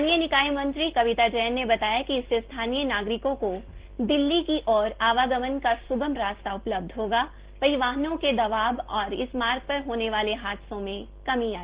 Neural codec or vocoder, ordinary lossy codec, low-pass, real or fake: none; Opus, 16 kbps; 3.6 kHz; real